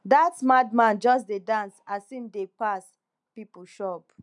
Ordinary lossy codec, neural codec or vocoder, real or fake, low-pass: none; none; real; 10.8 kHz